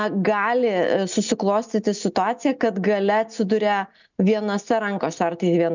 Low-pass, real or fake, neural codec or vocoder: 7.2 kHz; real; none